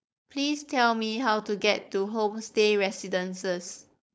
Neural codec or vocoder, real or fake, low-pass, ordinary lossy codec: codec, 16 kHz, 4.8 kbps, FACodec; fake; none; none